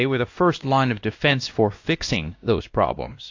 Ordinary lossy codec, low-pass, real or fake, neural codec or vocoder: AAC, 48 kbps; 7.2 kHz; fake; codec, 16 kHz, 1 kbps, X-Codec, WavLM features, trained on Multilingual LibriSpeech